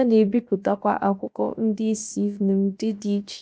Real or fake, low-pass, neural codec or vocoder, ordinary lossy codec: fake; none; codec, 16 kHz, about 1 kbps, DyCAST, with the encoder's durations; none